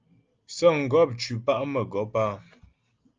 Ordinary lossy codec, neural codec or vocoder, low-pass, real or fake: Opus, 24 kbps; none; 7.2 kHz; real